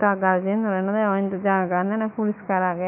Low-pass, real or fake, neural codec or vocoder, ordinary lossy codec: 3.6 kHz; fake; autoencoder, 48 kHz, 128 numbers a frame, DAC-VAE, trained on Japanese speech; none